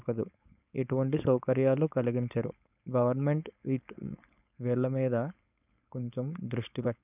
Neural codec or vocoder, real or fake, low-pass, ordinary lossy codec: codec, 16 kHz, 4.8 kbps, FACodec; fake; 3.6 kHz; none